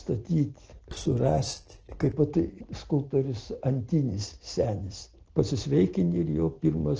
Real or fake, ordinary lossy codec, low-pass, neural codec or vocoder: real; Opus, 16 kbps; 7.2 kHz; none